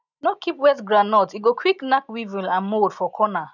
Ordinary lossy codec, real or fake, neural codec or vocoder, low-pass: none; real; none; 7.2 kHz